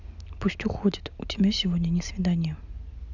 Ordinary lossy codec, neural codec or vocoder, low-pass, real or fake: none; none; 7.2 kHz; real